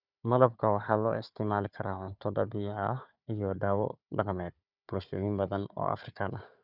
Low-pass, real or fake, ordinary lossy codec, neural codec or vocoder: 5.4 kHz; fake; none; codec, 16 kHz, 4 kbps, FunCodec, trained on Chinese and English, 50 frames a second